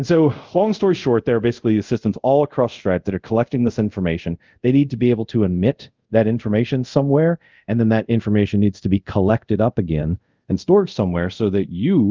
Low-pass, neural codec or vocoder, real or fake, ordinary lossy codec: 7.2 kHz; codec, 24 kHz, 0.5 kbps, DualCodec; fake; Opus, 24 kbps